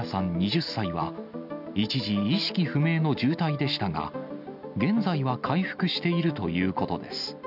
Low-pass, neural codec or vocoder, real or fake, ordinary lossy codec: 5.4 kHz; none; real; none